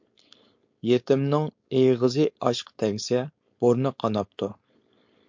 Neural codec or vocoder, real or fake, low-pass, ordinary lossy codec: codec, 16 kHz, 4.8 kbps, FACodec; fake; 7.2 kHz; MP3, 48 kbps